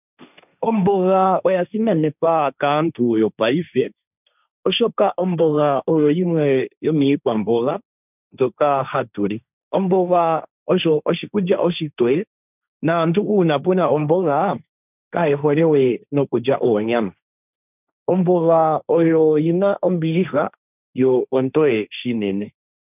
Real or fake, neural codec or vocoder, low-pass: fake; codec, 16 kHz, 1.1 kbps, Voila-Tokenizer; 3.6 kHz